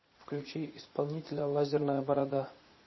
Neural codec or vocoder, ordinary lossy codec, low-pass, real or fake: vocoder, 44.1 kHz, 80 mel bands, Vocos; MP3, 24 kbps; 7.2 kHz; fake